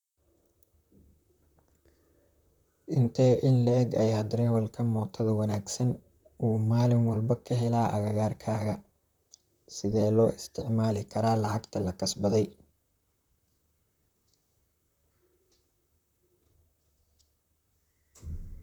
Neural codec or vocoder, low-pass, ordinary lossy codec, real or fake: vocoder, 44.1 kHz, 128 mel bands, Pupu-Vocoder; 19.8 kHz; none; fake